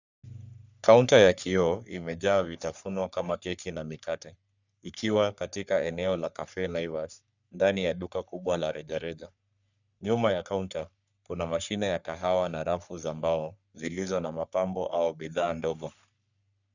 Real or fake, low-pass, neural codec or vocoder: fake; 7.2 kHz; codec, 44.1 kHz, 3.4 kbps, Pupu-Codec